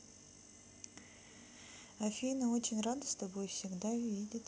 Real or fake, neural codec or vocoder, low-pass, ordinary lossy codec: real; none; none; none